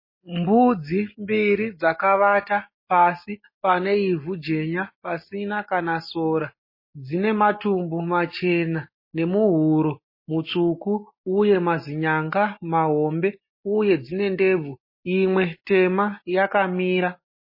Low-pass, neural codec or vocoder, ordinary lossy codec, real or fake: 5.4 kHz; none; MP3, 24 kbps; real